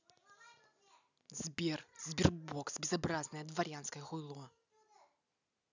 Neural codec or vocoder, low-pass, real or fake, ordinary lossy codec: none; 7.2 kHz; real; none